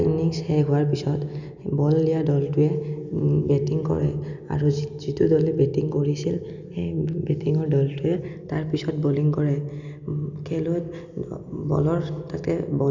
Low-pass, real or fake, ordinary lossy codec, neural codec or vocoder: 7.2 kHz; real; none; none